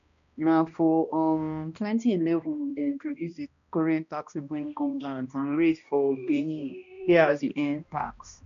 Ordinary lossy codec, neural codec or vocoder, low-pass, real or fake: none; codec, 16 kHz, 1 kbps, X-Codec, HuBERT features, trained on balanced general audio; 7.2 kHz; fake